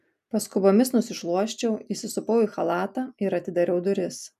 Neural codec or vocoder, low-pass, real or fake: none; 14.4 kHz; real